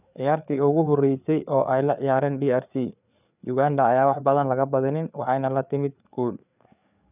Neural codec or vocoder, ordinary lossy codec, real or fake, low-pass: codec, 16 kHz, 4 kbps, FreqCodec, larger model; none; fake; 3.6 kHz